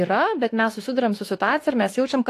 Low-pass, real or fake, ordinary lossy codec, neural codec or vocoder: 14.4 kHz; fake; AAC, 48 kbps; autoencoder, 48 kHz, 32 numbers a frame, DAC-VAE, trained on Japanese speech